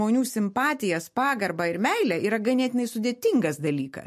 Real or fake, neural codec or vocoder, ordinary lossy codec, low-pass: real; none; MP3, 64 kbps; 14.4 kHz